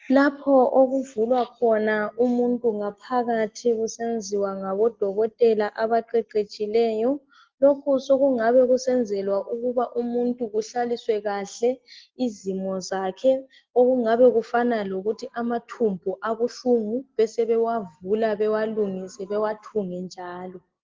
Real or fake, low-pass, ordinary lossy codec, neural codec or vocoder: real; 7.2 kHz; Opus, 16 kbps; none